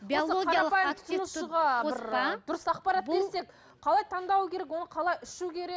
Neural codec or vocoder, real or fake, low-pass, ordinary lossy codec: none; real; none; none